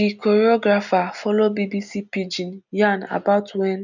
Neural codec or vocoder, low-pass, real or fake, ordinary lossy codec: none; 7.2 kHz; real; none